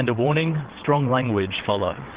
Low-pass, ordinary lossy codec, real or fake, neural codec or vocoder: 3.6 kHz; Opus, 16 kbps; fake; vocoder, 22.05 kHz, 80 mel bands, WaveNeXt